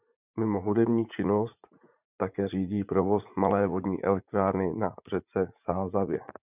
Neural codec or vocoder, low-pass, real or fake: vocoder, 44.1 kHz, 80 mel bands, Vocos; 3.6 kHz; fake